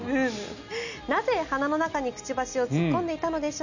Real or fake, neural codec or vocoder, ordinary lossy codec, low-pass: real; none; none; 7.2 kHz